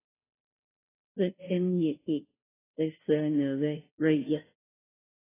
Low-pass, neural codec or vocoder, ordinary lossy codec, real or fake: 3.6 kHz; codec, 16 kHz, 0.5 kbps, FunCodec, trained on Chinese and English, 25 frames a second; AAC, 16 kbps; fake